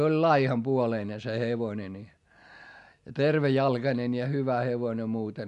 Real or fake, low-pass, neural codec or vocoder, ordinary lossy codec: real; 10.8 kHz; none; none